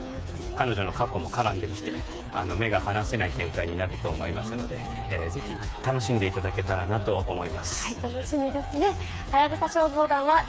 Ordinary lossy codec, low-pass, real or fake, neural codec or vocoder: none; none; fake; codec, 16 kHz, 4 kbps, FreqCodec, smaller model